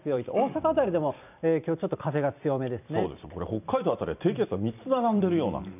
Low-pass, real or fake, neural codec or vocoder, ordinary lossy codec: 3.6 kHz; fake; vocoder, 44.1 kHz, 128 mel bands every 256 samples, BigVGAN v2; none